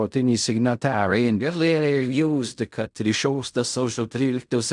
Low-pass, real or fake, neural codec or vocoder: 10.8 kHz; fake; codec, 16 kHz in and 24 kHz out, 0.4 kbps, LongCat-Audio-Codec, fine tuned four codebook decoder